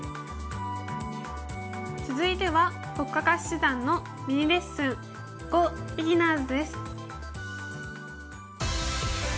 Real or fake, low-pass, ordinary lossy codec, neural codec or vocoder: real; none; none; none